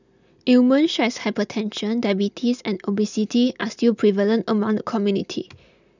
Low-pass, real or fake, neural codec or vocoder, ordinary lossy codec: 7.2 kHz; real; none; none